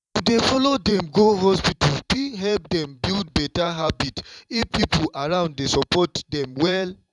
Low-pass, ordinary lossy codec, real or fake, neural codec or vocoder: 10.8 kHz; none; fake; vocoder, 44.1 kHz, 128 mel bands every 512 samples, BigVGAN v2